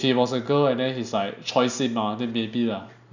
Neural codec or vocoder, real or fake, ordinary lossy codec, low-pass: none; real; none; 7.2 kHz